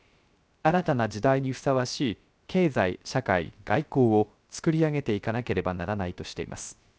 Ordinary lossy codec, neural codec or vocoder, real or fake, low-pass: none; codec, 16 kHz, 0.3 kbps, FocalCodec; fake; none